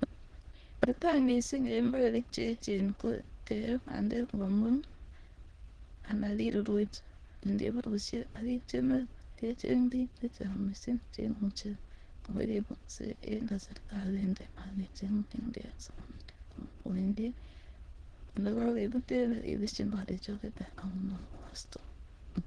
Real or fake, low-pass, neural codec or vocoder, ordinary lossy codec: fake; 9.9 kHz; autoencoder, 22.05 kHz, a latent of 192 numbers a frame, VITS, trained on many speakers; Opus, 16 kbps